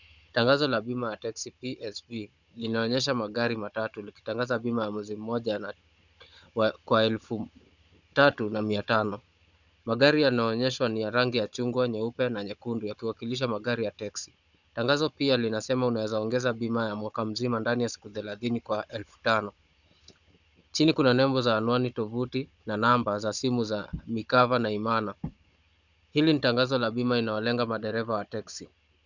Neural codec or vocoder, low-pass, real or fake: codec, 16 kHz, 16 kbps, FunCodec, trained on Chinese and English, 50 frames a second; 7.2 kHz; fake